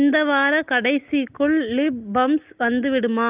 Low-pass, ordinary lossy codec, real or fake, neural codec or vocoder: 3.6 kHz; Opus, 32 kbps; real; none